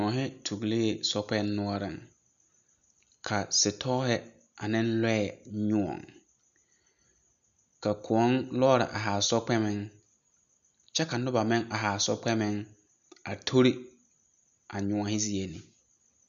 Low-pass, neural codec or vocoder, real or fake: 7.2 kHz; none; real